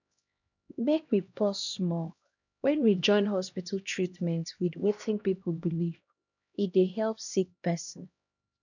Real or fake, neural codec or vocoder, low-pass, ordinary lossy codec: fake; codec, 16 kHz, 1 kbps, X-Codec, HuBERT features, trained on LibriSpeech; 7.2 kHz; none